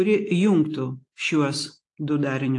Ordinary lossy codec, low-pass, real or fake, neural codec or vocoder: AAC, 48 kbps; 10.8 kHz; real; none